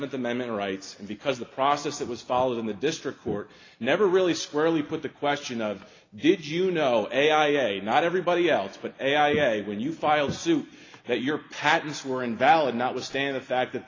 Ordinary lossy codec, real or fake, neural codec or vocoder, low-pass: AAC, 32 kbps; real; none; 7.2 kHz